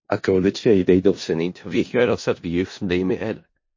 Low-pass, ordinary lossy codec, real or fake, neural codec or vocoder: 7.2 kHz; MP3, 32 kbps; fake; codec, 16 kHz in and 24 kHz out, 0.4 kbps, LongCat-Audio-Codec, four codebook decoder